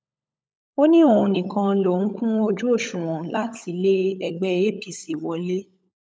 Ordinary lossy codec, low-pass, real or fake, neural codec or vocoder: none; none; fake; codec, 16 kHz, 16 kbps, FunCodec, trained on LibriTTS, 50 frames a second